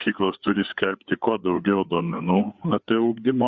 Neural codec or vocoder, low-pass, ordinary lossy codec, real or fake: codec, 16 kHz, 4 kbps, FreqCodec, larger model; 7.2 kHz; Opus, 64 kbps; fake